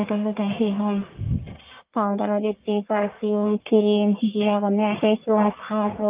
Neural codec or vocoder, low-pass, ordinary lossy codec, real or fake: codec, 44.1 kHz, 1.7 kbps, Pupu-Codec; 3.6 kHz; Opus, 32 kbps; fake